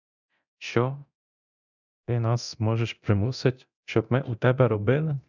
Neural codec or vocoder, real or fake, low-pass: codec, 24 kHz, 0.9 kbps, DualCodec; fake; 7.2 kHz